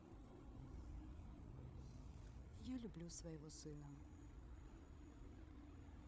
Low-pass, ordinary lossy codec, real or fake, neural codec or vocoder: none; none; fake; codec, 16 kHz, 8 kbps, FreqCodec, larger model